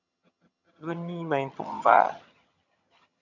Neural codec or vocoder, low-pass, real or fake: vocoder, 22.05 kHz, 80 mel bands, HiFi-GAN; 7.2 kHz; fake